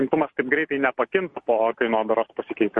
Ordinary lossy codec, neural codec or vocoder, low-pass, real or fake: MP3, 48 kbps; none; 10.8 kHz; real